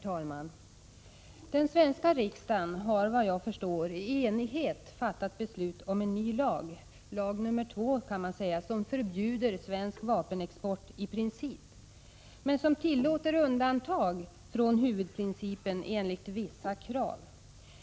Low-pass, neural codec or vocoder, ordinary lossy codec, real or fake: none; none; none; real